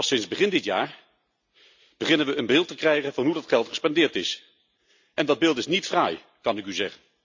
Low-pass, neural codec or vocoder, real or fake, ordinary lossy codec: 7.2 kHz; none; real; MP3, 64 kbps